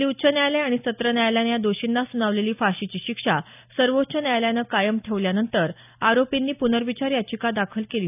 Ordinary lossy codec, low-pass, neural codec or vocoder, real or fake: none; 3.6 kHz; none; real